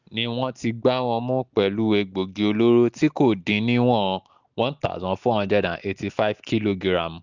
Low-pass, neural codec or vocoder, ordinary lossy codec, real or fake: 7.2 kHz; none; none; real